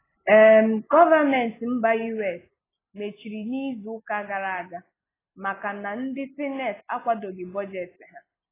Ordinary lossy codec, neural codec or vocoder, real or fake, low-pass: AAC, 16 kbps; none; real; 3.6 kHz